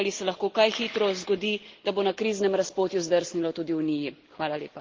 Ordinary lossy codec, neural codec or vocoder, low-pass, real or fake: Opus, 16 kbps; none; 7.2 kHz; real